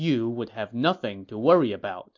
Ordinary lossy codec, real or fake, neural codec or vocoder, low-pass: MP3, 48 kbps; real; none; 7.2 kHz